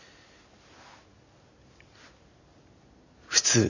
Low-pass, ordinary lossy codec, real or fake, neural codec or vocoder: 7.2 kHz; none; real; none